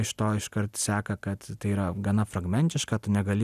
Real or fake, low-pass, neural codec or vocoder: real; 14.4 kHz; none